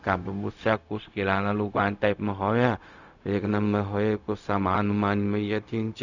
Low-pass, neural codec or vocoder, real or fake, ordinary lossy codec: 7.2 kHz; codec, 16 kHz, 0.4 kbps, LongCat-Audio-Codec; fake; none